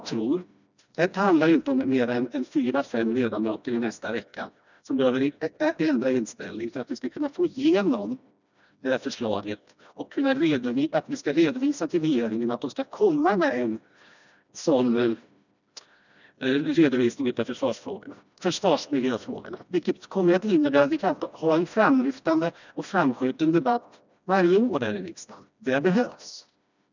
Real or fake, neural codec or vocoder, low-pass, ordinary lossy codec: fake; codec, 16 kHz, 1 kbps, FreqCodec, smaller model; 7.2 kHz; none